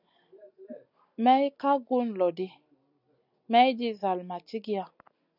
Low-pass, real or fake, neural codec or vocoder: 5.4 kHz; real; none